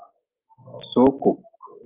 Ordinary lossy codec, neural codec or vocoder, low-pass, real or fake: Opus, 32 kbps; none; 3.6 kHz; real